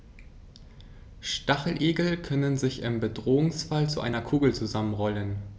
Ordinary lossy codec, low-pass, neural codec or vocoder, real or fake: none; none; none; real